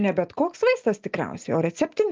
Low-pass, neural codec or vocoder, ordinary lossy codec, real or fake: 7.2 kHz; none; Opus, 24 kbps; real